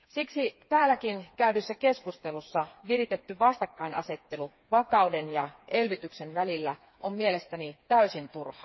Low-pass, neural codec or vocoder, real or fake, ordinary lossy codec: 7.2 kHz; codec, 16 kHz, 4 kbps, FreqCodec, smaller model; fake; MP3, 24 kbps